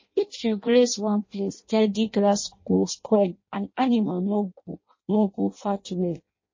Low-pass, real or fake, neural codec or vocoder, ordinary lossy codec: 7.2 kHz; fake; codec, 16 kHz in and 24 kHz out, 0.6 kbps, FireRedTTS-2 codec; MP3, 32 kbps